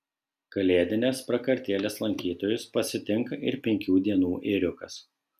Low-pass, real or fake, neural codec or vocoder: 14.4 kHz; real; none